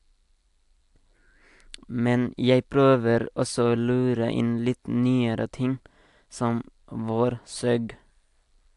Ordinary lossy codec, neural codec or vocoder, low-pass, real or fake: AAC, 64 kbps; none; 10.8 kHz; real